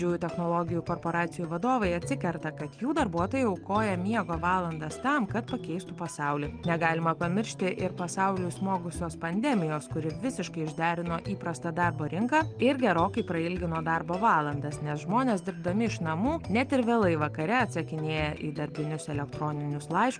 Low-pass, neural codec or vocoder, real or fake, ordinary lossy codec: 9.9 kHz; none; real; Opus, 32 kbps